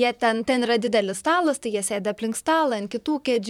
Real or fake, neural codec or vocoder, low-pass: fake; vocoder, 44.1 kHz, 128 mel bands, Pupu-Vocoder; 19.8 kHz